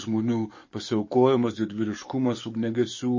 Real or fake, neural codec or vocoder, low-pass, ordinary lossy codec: fake; codec, 44.1 kHz, 7.8 kbps, DAC; 7.2 kHz; MP3, 32 kbps